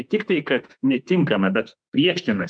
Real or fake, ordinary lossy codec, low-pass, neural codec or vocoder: fake; MP3, 96 kbps; 9.9 kHz; autoencoder, 48 kHz, 32 numbers a frame, DAC-VAE, trained on Japanese speech